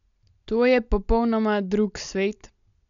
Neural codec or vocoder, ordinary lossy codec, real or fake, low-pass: none; none; real; 7.2 kHz